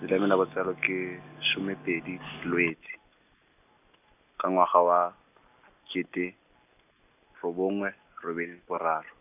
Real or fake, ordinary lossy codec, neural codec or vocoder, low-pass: real; none; none; 3.6 kHz